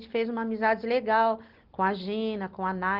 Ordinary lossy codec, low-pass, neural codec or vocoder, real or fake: Opus, 16 kbps; 5.4 kHz; none; real